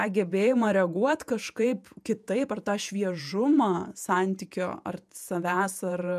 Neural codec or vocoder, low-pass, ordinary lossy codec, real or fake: vocoder, 48 kHz, 128 mel bands, Vocos; 14.4 kHz; MP3, 96 kbps; fake